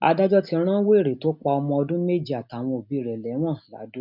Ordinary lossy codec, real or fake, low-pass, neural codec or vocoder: none; real; 5.4 kHz; none